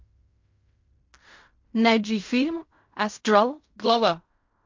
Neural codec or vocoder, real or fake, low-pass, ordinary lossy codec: codec, 16 kHz in and 24 kHz out, 0.4 kbps, LongCat-Audio-Codec, fine tuned four codebook decoder; fake; 7.2 kHz; MP3, 48 kbps